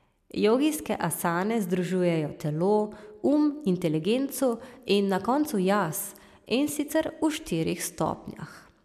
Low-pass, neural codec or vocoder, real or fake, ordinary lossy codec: 14.4 kHz; none; real; MP3, 96 kbps